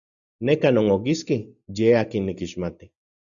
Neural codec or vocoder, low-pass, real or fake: none; 7.2 kHz; real